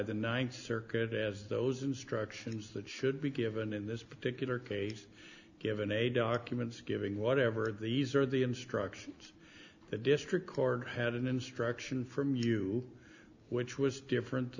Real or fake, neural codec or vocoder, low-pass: real; none; 7.2 kHz